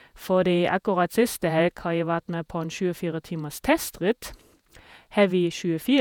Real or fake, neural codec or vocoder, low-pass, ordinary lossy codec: fake; vocoder, 48 kHz, 128 mel bands, Vocos; none; none